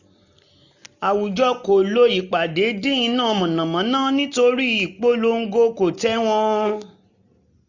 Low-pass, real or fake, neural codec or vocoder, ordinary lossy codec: 7.2 kHz; real; none; none